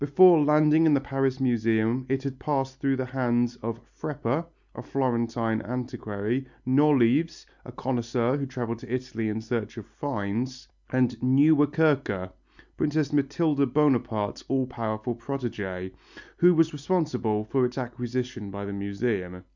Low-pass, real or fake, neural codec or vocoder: 7.2 kHz; real; none